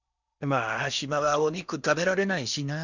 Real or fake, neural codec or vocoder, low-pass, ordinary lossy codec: fake; codec, 16 kHz in and 24 kHz out, 0.8 kbps, FocalCodec, streaming, 65536 codes; 7.2 kHz; none